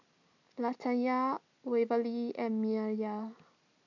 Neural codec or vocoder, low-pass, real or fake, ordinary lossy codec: none; 7.2 kHz; real; none